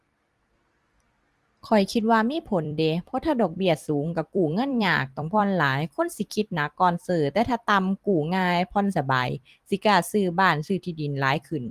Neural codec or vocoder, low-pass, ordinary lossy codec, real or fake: vocoder, 44.1 kHz, 128 mel bands every 256 samples, BigVGAN v2; 14.4 kHz; Opus, 24 kbps; fake